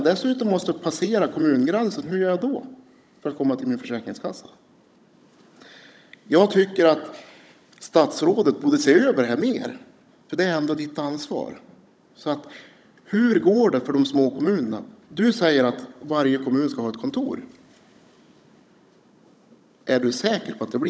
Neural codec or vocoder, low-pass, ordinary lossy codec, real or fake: codec, 16 kHz, 16 kbps, FunCodec, trained on Chinese and English, 50 frames a second; none; none; fake